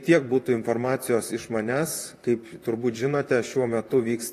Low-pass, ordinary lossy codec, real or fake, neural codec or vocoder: 14.4 kHz; AAC, 48 kbps; fake; vocoder, 44.1 kHz, 128 mel bands, Pupu-Vocoder